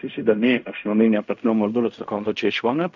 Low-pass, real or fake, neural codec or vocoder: 7.2 kHz; fake; codec, 16 kHz in and 24 kHz out, 0.4 kbps, LongCat-Audio-Codec, fine tuned four codebook decoder